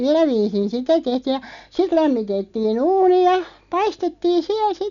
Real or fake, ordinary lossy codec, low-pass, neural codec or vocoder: real; none; 7.2 kHz; none